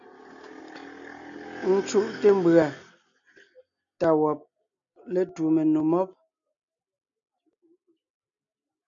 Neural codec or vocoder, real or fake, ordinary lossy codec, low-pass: none; real; AAC, 64 kbps; 7.2 kHz